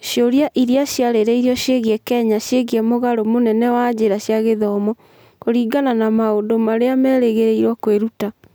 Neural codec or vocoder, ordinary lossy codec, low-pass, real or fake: none; none; none; real